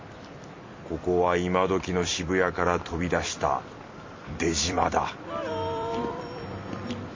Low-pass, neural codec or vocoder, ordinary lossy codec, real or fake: 7.2 kHz; vocoder, 44.1 kHz, 128 mel bands every 256 samples, BigVGAN v2; MP3, 32 kbps; fake